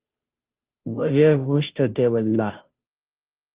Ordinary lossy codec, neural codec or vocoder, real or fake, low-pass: Opus, 32 kbps; codec, 16 kHz, 0.5 kbps, FunCodec, trained on Chinese and English, 25 frames a second; fake; 3.6 kHz